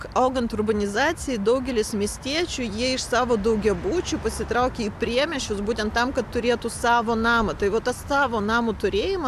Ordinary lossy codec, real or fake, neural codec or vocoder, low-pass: AAC, 96 kbps; real; none; 14.4 kHz